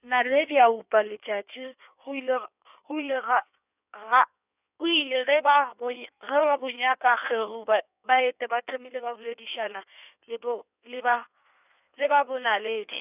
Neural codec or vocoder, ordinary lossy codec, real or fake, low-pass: codec, 16 kHz in and 24 kHz out, 1.1 kbps, FireRedTTS-2 codec; none; fake; 3.6 kHz